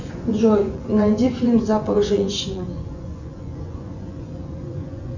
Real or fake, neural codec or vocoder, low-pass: fake; vocoder, 24 kHz, 100 mel bands, Vocos; 7.2 kHz